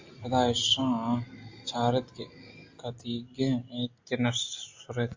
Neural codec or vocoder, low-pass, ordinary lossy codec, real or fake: none; 7.2 kHz; AAC, 48 kbps; real